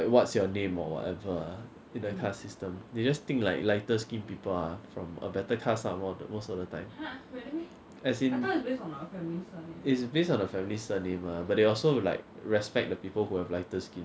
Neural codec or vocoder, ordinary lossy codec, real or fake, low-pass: none; none; real; none